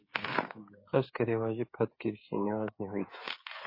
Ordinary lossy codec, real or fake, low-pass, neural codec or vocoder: MP3, 24 kbps; fake; 5.4 kHz; codec, 16 kHz, 8 kbps, FreqCodec, smaller model